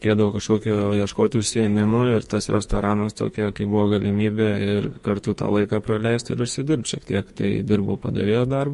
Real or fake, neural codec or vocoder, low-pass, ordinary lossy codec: fake; codec, 44.1 kHz, 2.6 kbps, SNAC; 14.4 kHz; MP3, 48 kbps